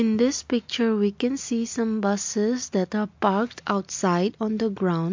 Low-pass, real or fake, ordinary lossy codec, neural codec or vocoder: 7.2 kHz; real; MP3, 48 kbps; none